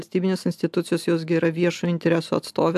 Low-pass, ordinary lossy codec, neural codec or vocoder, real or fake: 14.4 kHz; AAC, 96 kbps; none; real